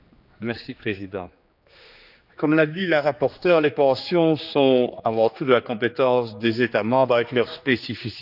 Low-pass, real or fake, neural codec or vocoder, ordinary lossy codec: 5.4 kHz; fake; codec, 16 kHz, 2 kbps, X-Codec, HuBERT features, trained on general audio; none